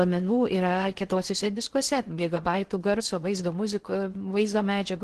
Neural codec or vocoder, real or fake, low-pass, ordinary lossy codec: codec, 16 kHz in and 24 kHz out, 0.6 kbps, FocalCodec, streaming, 4096 codes; fake; 10.8 kHz; Opus, 16 kbps